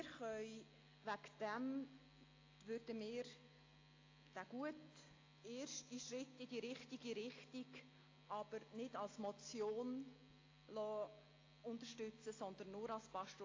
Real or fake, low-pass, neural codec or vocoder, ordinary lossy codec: real; 7.2 kHz; none; AAC, 32 kbps